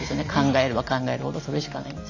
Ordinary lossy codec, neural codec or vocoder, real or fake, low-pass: none; none; real; 7.2 kHz